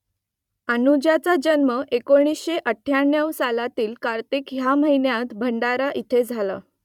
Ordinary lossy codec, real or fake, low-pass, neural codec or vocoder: none; fake; 19.8 kHz; vocoder, 44.1 kHz, 128 mel bands every 256 samples, BigVGAN v2